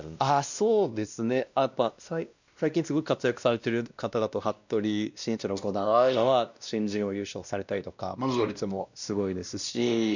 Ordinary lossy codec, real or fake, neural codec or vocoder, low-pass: none; fake; codec, 16 kHz, 1 kbps, X-Codec, WavLM features, trained on Multilingual LibriSpeech; 7.2 kHz